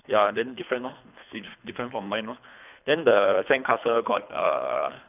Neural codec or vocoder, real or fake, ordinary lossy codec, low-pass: codec, 24 kHz, 3 kbps, HILCodec; fake; none; 3.6 kHz